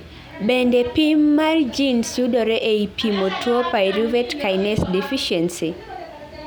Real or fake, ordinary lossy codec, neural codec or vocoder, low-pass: real; none; none; none